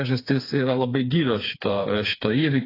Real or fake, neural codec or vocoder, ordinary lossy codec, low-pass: fake; codec, 16 kHz, 4 kbps, FreqCodec, smaller model; AAC, 24 kbps; 5.4 kHz